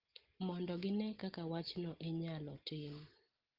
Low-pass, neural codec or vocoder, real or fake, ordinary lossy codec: 5.4 kHz; none; real; Opus, 16 kbps